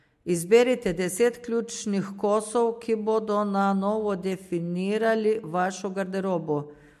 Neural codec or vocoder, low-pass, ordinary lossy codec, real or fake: none; 14.4 kHz; MP3, 64 kbps; real